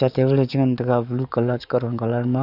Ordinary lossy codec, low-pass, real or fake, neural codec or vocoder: none; 5.4 kHz; fake; codec, 44.1 kHz, 7.8 kbps, DAC